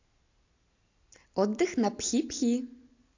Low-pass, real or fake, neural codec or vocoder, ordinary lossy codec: 7.2 kHz; real; none; AAC, 48 kbps